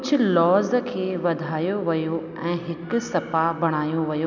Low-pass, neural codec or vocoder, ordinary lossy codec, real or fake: 7.2 kHz; none; none; real